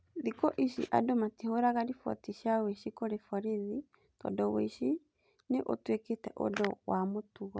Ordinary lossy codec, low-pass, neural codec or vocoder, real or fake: none; none; none; real